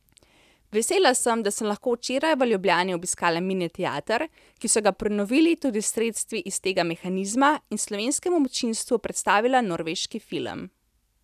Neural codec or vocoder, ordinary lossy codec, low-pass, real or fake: none; none; 14.4 kHz; real